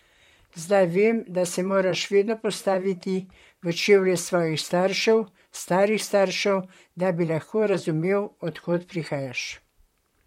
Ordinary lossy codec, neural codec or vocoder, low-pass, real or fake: MP3, 64 kbps; vocoder, 44.1 kHz, 128 mel bands, Pupu-Vocoder; 19.8 kHz; fake